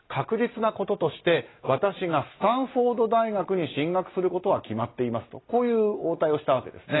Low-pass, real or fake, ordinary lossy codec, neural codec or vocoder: 7.2 kHz; real; AAC, 16 kbps; none